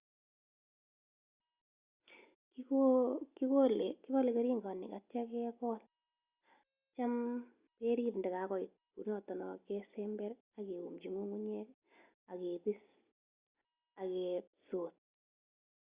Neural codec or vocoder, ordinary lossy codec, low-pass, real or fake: none; Opus, 64 kbps; 3.6 kHz; real